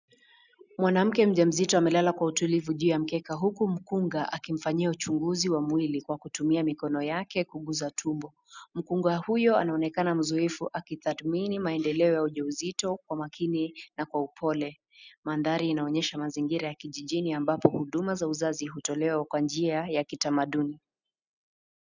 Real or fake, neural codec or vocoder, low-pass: real; none; 7.2 kHz